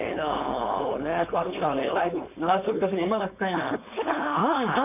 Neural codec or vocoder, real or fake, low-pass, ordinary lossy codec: codec, 16 kHz, 4.8 kbps, FACodec; fake; 3.6 kHz; none